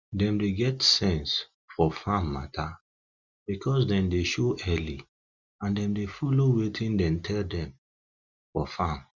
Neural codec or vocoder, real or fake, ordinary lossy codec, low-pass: none; real; Opus, 64 kbps; 7.2 kHz